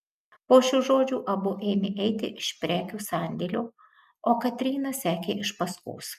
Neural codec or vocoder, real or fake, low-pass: none; real; 14.4 kHz